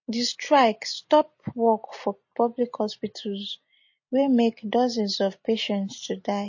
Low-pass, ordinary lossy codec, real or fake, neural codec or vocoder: 7.2 kHz; MP3, 32 kbps; real; none